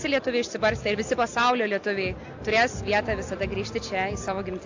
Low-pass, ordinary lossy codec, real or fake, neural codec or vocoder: 7.2 kHz; AAC, 48 kbps; real; none